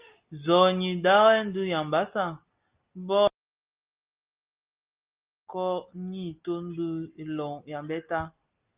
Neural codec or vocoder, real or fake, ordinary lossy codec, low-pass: none; real; Opus, 24 kbps; 3.6 kHz